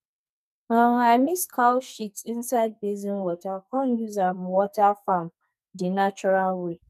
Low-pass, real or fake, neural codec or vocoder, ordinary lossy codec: 14.4 kHz; fake; codec, 44.1 kHz, 2.6 kbps, SNAC; none